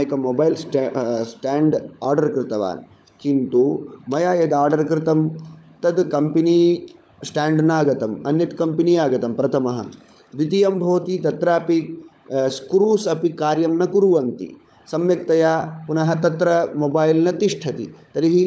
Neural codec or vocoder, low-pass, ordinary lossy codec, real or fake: codec, 16 kHz, 16 kbps, FunCodec, trained on LibriTTS, 50 frames a second; none; none; fake